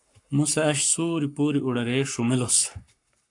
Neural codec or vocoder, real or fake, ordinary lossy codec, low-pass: codec, 44.1 kHz, 7.8 kbps, Pupu-Codec; fake; AAC, 64 kbps; 10.8 kHz